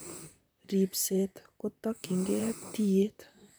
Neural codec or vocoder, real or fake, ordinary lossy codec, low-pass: vocoder, 44.1 kHz, 128 mel bands, Pupu-Vocoder; fake; none; none